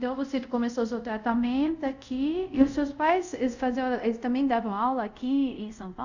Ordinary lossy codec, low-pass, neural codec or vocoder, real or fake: none; 7.2 kHz; codec, 24 kHz, 0.5 kbps, DualCodec; fake